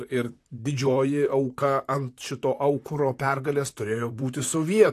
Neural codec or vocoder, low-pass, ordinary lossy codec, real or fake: vocoder, 44.1 kHz, 128 mel bands, Pupu-Vocoder; 14.4 kHz; AAC, 64 kbps; fake